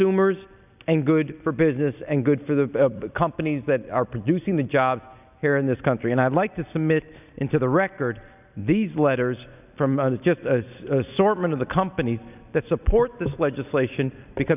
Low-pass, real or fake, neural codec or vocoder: 3.6 kHz; real; none